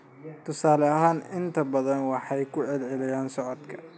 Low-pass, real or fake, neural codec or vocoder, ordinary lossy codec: none; real; none; none